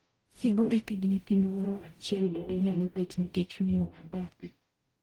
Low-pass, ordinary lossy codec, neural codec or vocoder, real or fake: 19.8 kHz; Opus, 24 kbps; codec, 44.1 kHz, 0.9 kbps, DAC; fake